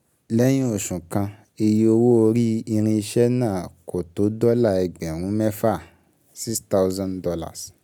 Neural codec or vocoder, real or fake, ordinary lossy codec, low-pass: none; real; none; 19.8 kHz